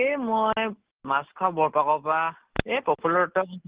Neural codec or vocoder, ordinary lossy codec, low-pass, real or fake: none; Opus, 16 kbps; 3.6 kHz; real